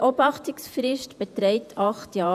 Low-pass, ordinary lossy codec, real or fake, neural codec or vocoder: 14.4 kHz; none; fake; vocoder, 48 kHz, 128 mel bands, Vocos